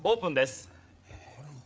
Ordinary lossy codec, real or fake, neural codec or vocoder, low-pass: none; fake; codec, 16 kHz, 8 kbps, FreqCodec, larger model; none